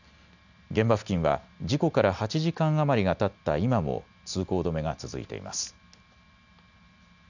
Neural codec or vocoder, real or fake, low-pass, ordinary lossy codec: none; real; 7.2 kHz; none